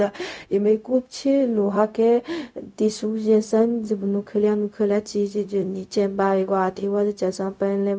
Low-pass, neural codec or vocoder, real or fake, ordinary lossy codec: none; codec, 16 kHz, 0.4 kbps, LongCat-Audio-Codec; fake; none